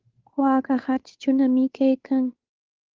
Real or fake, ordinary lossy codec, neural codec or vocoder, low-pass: fake; Opus, 16 kbps; codec, 16 kHz, 8 kbps, FunCodec, trained on Chinese and English, 25 frames a second; 7.2 kHz